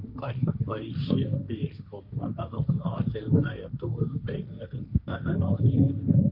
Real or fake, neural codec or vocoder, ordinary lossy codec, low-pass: fake; codec, 16 kHz, 1.1 kbps, Voila-Tokenizer; none; 5.4 kHz